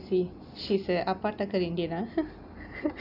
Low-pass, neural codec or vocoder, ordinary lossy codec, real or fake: 5.4 kHz; none; none; real